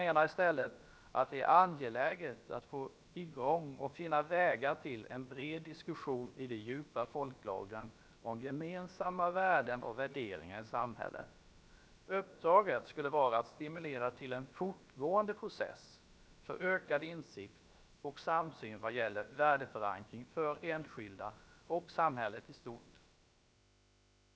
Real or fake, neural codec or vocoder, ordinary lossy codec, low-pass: fake; codec, 16 kHz, about 1 kbps, DyCAST, with the encoder's durations; none; none